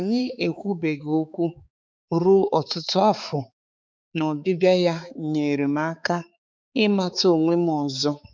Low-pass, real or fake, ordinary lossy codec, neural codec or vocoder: none; fake; none; codec, 16 kHz, 4 kbps, X-Codec, HuBERT features, trained on balanced general audio